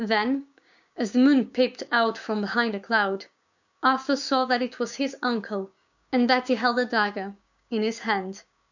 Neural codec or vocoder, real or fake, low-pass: codec, 16 kHz, 6 kbps, DAC; fake; 7.2 kHz